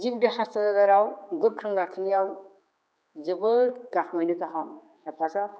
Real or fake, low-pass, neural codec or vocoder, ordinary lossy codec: fake; none; codec, 16 kHz, 4 kbps, X-Codec, HuBERT features, trained on general audio; none